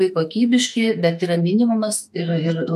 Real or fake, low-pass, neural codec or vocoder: fake; 14.4 kHz; autoencoder, 48 kHz, 32 numbers a frame, DAC-VAE, trained on Japanese speech